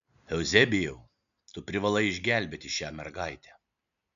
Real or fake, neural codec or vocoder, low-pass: real; none; 7.2 kHz